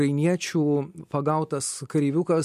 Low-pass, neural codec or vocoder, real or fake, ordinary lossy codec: 14.4 kHz; none; real; MP3, 64 kbps